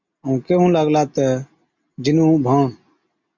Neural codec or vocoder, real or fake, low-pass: none; real; 7.2 kHz